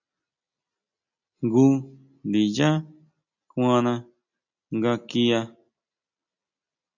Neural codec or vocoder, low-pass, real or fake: none; 7.2 kHz; real